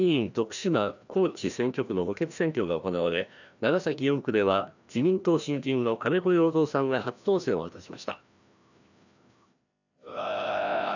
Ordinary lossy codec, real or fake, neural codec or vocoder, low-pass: none; fake; codec, 16 kHz, 1 kbps, FreqCodec, larger model; 7.2 kHz